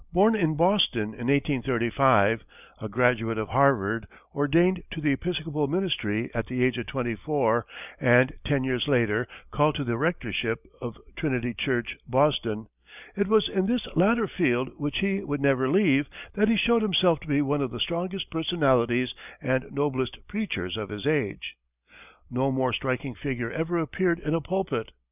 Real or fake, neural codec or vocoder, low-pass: real; none; 3.6 kHz